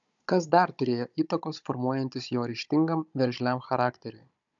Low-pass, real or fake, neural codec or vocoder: 7.2 kHz; fake; codec, 16 kHz, 16 kbps, FunCodec, trained on Chinese and English, 50 frames a second